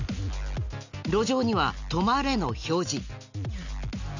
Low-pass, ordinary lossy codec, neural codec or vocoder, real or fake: 7.2 kHz; none; none; real